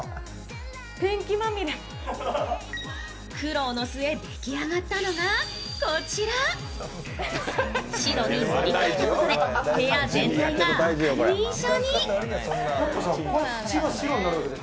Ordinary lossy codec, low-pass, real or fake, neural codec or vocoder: none; none; real; none